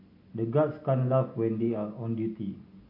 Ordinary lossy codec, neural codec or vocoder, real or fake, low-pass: AAC, 32 kbps; none; real; 5.4 kHz